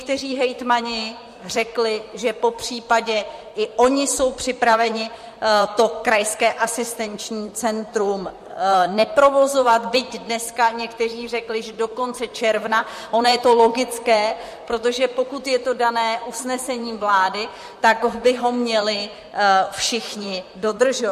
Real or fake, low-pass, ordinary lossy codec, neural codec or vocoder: fake; 14.4 kHz; MP3, 64 kbps; vocoder, 44.1 kHz, 128 mel bands, Pupu-Vocoder